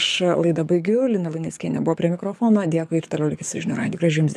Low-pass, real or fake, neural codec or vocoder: 14.4 kHz; fake; codec, 44.1 kHz, 7.8 kbps, DAC